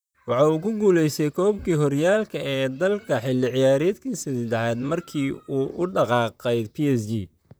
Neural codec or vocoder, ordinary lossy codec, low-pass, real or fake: vocoder, 44.1 kHz, 128 mel bands, Pupu-Vocoder; none; none; fake